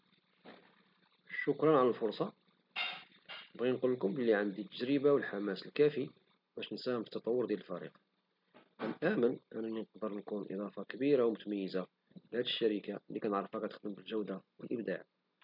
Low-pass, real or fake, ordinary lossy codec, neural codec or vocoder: 5.4 kHz; real; none; none